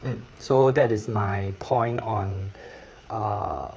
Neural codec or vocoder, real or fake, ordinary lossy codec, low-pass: codec, 16 kHz, 4 kbps, FreqCodec, larger model; fake; none; none